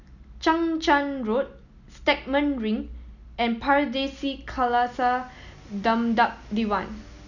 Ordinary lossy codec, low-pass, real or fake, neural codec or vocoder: none; 7.2 kHz; real; none